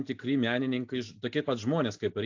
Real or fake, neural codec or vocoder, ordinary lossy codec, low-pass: real; none; AAC, 48 kbps; 7.2 kHz